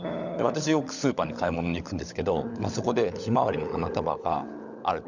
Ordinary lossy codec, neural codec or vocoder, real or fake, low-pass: none; codec, 16 kHz, 16 kbps, FunCodec, trained on LibriTTS, 50 frames a second; fake; 7.2 kHz